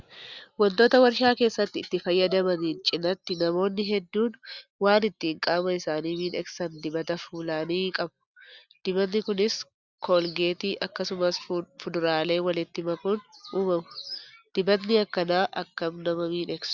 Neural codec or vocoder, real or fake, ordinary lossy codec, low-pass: none; real; Opus, 64 kbps; 7.2 kHz